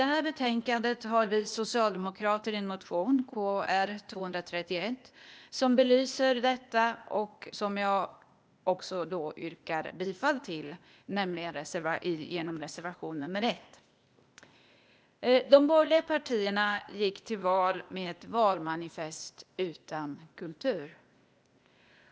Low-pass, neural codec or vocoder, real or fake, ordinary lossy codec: none; codec, 16 kHz, 0.8 kbps, ZipCodec; fake; none